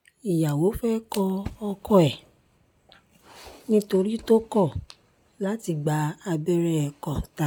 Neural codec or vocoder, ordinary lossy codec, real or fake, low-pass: none; none; real; none